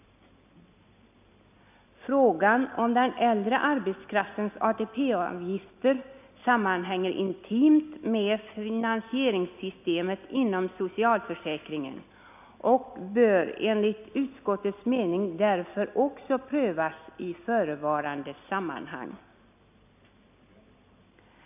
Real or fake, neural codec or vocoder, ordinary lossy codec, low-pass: real; none; none; 3.6 kHz